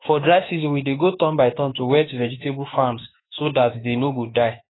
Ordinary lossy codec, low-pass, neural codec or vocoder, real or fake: AAC, 16 kbps; 7.2 kHz; autoencoder, 48 kHz, 32 numbers a frame, DAC-VAE, trained on Japanese speech; fake